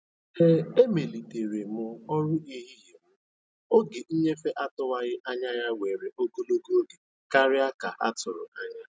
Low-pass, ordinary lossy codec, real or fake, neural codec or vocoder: none; none; real; none